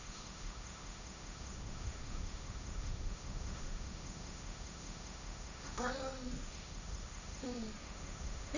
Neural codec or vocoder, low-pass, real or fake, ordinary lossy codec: codec, 16 kHz, 1.1 kbps, Voila-Tokenizer; 7.2 kHz; fake; none